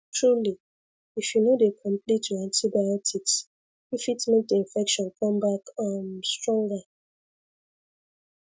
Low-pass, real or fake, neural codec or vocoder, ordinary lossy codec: none; real; none; none